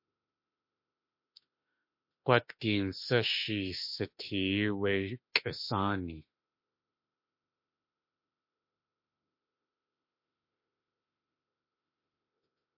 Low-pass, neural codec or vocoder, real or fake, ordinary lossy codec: 5.4 kHz; autoencoder, 48 kHz, 32 numbers a frame, DAC-VAE, trained on Japanese speech; fake; MP3, 32 kbps